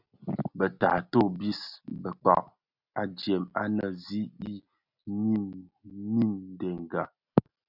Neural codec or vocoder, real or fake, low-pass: none; real; 5.4 kHz